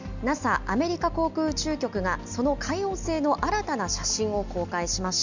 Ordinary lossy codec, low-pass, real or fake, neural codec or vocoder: none; 7.2 kHz; real; none